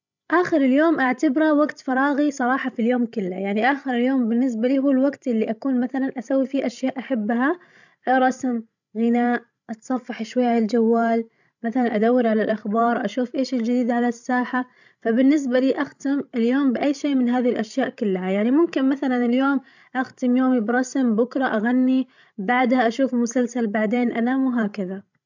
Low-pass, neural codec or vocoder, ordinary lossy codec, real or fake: 7.2 kHz; codec, 16 kHz, 16 kbps, FreqCodec, larger model; none; fake